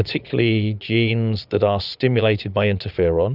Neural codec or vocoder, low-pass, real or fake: vocoder, 22.05 kHz, 80 mel bands, Vocos; 5.4 kHz; fake